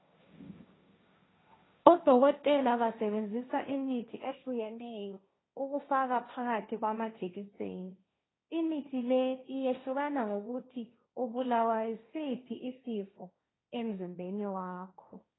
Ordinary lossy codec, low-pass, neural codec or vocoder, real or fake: AAC, 16 kbps; 7.2 kHz; codec, 16 kHz, 1.1 kbps, Voila-Tokenizer; fake